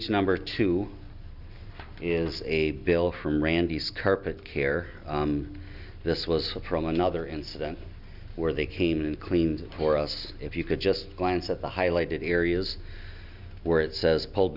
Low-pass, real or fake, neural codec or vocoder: 5.4 kHz; real; none